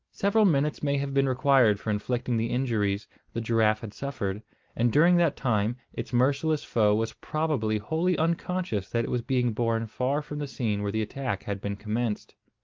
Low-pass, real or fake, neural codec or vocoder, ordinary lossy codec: 7.2 kHz; real; none; Opus, 32 kbps